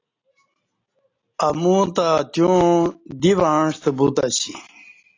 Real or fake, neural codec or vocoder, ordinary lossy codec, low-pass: real; none; AAC, 32 kbps; 7.2 kHz